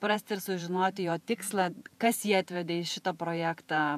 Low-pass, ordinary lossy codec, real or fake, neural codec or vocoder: 14.4 kHz; AAC, 96 kbps; fake; vocoder, 48 kHz, 128 mel bands, Vocos